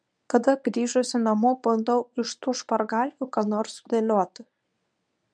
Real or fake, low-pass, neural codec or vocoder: fake; 9.9 kHz; codec, 24 kHz, 0.9 kbps, WavTokenizer, medium speech release version 1